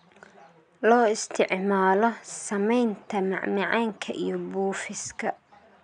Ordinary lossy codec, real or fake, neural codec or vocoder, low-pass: none; real; none; 9.9 kHz